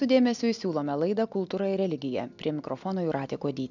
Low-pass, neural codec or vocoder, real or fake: 7.2 kHz; none; real